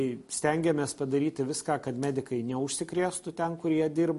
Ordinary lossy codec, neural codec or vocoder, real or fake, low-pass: MP3, 48 kbps; none; real; 14.4 kHz